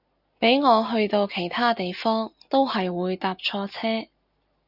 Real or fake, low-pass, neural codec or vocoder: real; 5.4 kHz; none